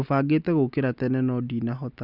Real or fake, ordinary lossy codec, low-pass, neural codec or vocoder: real; Opus, 64 kbps; 5.4 kHz; none